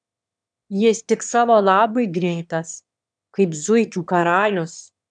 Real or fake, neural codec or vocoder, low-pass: fake; autoencoder, 22.05 kHz, a latent of 192 numbers a frame, VITS, trained on one speaker; 9.9 kHz